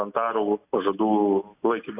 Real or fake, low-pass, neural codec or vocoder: real; 3.6 kHz; none